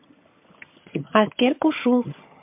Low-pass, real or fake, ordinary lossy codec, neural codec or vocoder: 3.6 kHz; fake; MP3, 32 kbps; vocoder, 22.05 kHz, 80 mel bands, HiFi-GAN